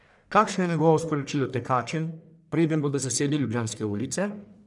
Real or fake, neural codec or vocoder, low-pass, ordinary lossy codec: fake; codec, 44.1 kHz, 1.7 kbps, Pupu-Codec; 10.8 kHz; none